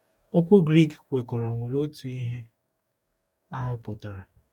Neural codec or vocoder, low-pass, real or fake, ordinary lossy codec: codec, 44.1 kHz, 2.6 kbps, DAC; 19.8 kHz; fake; none